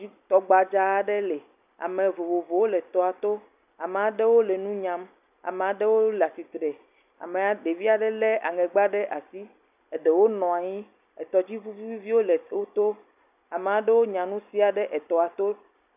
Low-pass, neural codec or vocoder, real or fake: 3.6 kHz; none; real